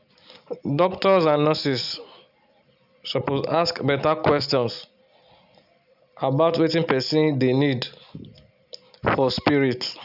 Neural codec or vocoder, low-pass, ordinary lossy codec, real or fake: none; 5.4 kHz; none; real